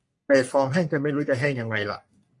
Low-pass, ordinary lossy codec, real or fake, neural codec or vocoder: 10.8 kHz; MP3, 48 kbps; fake; codec, 44.1 kHz, 3.4 kbps, Pupu-Codec